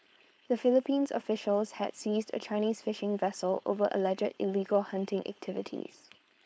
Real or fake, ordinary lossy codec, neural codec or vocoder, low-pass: fake; none; codec, 16 kHz, 4.8 kbps, FACodec; none